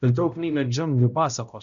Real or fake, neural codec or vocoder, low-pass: fake; codec, 16 kHz, 0.5 kbps, X-Codec, HuBERT features, trained on balanced general audio; 7.2 kHz